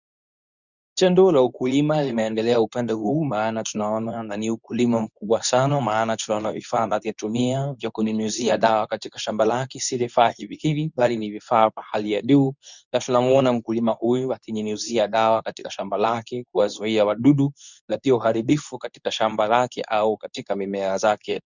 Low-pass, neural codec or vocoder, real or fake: 7.2 kHz; codec, 24 kHz, 0.9 kbps, WavTokenizer, medium speech release version 2; fake